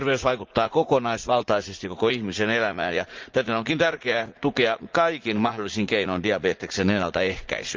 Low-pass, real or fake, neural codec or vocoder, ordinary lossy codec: 7.2 kHz; fake; vocoder, 44.1 kHz, 80 mel bands, Vocos; Opus, 32 kbps